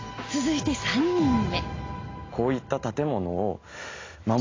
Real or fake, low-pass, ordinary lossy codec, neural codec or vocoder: real; 7.2 kHz; AAC, 32 kbps; none